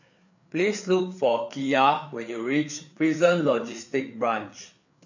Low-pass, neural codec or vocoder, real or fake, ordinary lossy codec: 7.2 kHz; codec, 16 kHz, 4 kbps, FreqCodec, larger model; fake; none